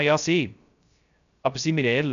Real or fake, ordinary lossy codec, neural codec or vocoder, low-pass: fake; none; codec, 16 kHz, 0.3 kbps, FocalCodec; 7.2 kHz